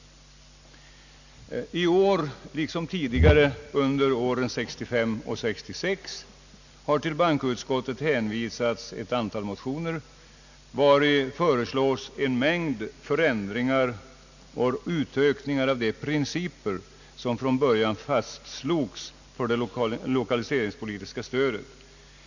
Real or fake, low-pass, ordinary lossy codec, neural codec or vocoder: real; 7.2 kHz; none; none